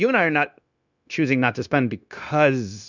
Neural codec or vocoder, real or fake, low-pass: codec, 16 kHz, 0.9 kbps, LongCat-Audio-Codec; fake; 7.2 kHz